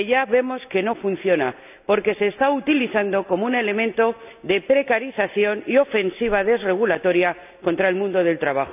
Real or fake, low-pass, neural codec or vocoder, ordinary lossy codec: real; 3.6 kHz; none; none